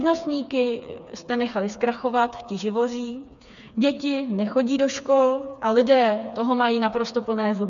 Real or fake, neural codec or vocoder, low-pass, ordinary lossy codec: fake; codec, 16 kHz, 4 kbps, FreqCodec, smaller model; 7.2 kHz; MP3, 96 kbps